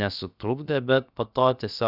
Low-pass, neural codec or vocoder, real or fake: 5.4 kHz; codec, 16 kHz, about 1 kbps, DyCAST, with the encoder's durations; fake